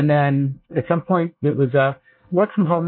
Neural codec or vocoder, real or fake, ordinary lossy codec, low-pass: codec, 24 kHz, 1 kbps, SNAC; fake; MP3, 32 kbps; 5.4 kHz